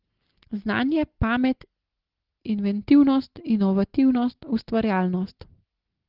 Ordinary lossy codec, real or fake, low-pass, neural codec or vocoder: Opus, 16 kbps; real; 5.4 kHz; none